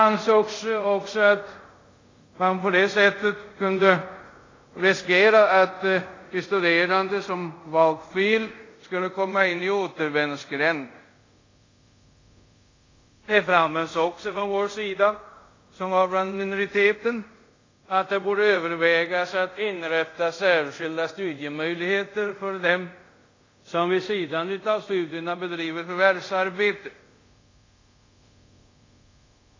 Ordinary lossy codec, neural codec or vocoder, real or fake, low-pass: AAC, 32 kbps; codec, 24 kHz, 0.5 kbps, DualCodec; fake; 7.2 kHz